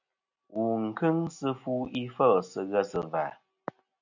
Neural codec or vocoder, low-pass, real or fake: none; 7.2 kHz; real